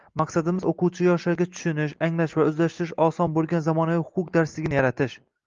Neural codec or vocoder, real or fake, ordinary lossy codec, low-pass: none; real; Opus, 32 kbps; 7.2 kHz